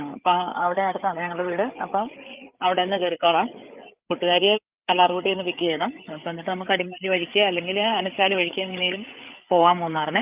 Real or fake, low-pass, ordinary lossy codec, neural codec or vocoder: fake; 3.6 kHz; Opus, 24 kbps; codec, 16 kHz, 8 kbps, FreqCodec, larger model